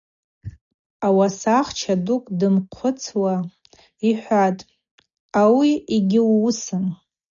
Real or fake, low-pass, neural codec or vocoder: real; 7.2 kHz; none